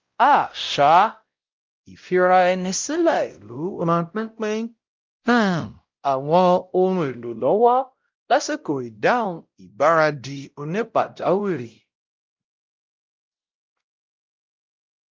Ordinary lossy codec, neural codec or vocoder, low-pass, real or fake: Opus, 24 kbps; codec, 16 kHz, 0.5 kbps, X-Codec, WavLM features, trained on Multilingual LibriSpeech; 7.2 kHz; fake